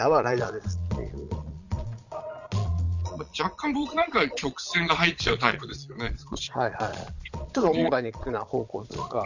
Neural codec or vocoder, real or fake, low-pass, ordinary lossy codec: vocoder, 22.05 kHz, 80 mel bands, Vocos; fake; 7.2 kHz; none